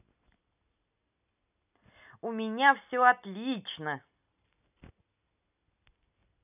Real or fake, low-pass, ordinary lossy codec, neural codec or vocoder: real; 3.6 kHz; none; none